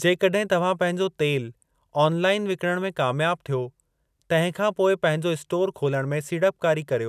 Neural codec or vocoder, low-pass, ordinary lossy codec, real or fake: none; 14.4 kHz; none; real